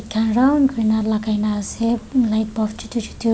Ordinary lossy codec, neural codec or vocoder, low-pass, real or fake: none; none; none; real